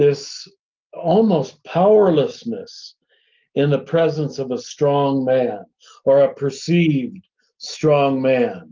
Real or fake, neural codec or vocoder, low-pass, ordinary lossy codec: real; none; 7.2 kHz; Opus, 32 kbps